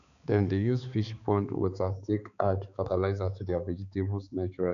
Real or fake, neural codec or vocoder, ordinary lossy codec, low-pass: fake; codec, 16 kHz, 4 kbps, X-Codec, HuBERT features, trained on balanced general audio; MP3, 96 kbps; 7.2 kHz